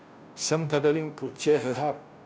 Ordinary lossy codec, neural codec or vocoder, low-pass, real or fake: none; codec, 16 kHz, 0.5 kbps, FunCodec, trained on Chinese and English, 25 frames a second; none; fake